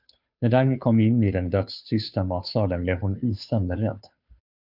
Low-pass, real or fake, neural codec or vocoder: 5.4 kHz; fake; codec, 16 kHz, 2 kbps, FunCodec, trained on Chinese and English, 25 frames a second